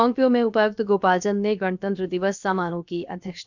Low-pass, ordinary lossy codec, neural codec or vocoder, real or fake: 7.2 kHz; none; codec, 16 kHz, 0.7 kbps, FocalCodec; fake